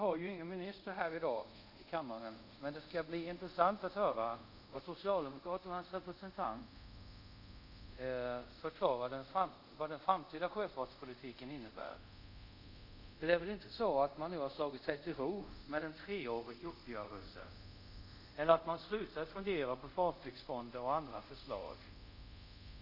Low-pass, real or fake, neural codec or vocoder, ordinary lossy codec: 5.4 kHz; fake; codec, 24 kHz, 0.5 kbps, DualCodec; none